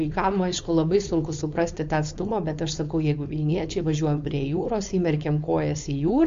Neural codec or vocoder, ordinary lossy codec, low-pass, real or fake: codec, 16 kHz, 4.8 kbps, FACodec; MP3, 48 kbps; 7.2 kHz; fake